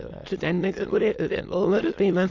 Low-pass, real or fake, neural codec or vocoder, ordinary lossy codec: 7.2 kHz; fake; autoencoder, 22.05 kHz, a latent of 192 numbers a frame, VITS, trained on many speakers; AAC, 48 kbps